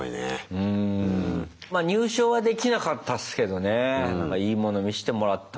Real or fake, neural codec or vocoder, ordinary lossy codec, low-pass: real; none; none; none